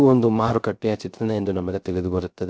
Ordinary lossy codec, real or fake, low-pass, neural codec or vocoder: none; fake; none; codec, 16 kHz, 0.3 kbps, FocalCodec